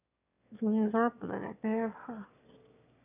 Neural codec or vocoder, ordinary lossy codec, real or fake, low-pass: autoencoder, 22.05 kHz, a latent of 192 numbers a frame, VITS, trained on one speaker; none; fake; 3.6 kHz